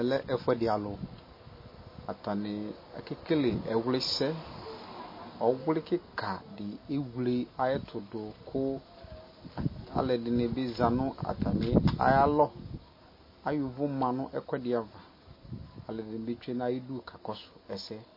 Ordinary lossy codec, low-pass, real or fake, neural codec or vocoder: MP3, 24 kbps; 5.4 kHz; real; none